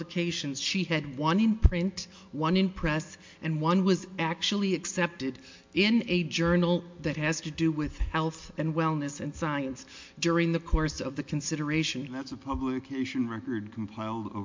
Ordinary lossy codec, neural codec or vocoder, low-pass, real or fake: MP3, 48 kbps; none; 7.2 kHz; real